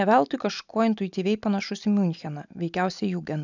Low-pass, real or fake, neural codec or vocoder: 7.2 kHz; real; none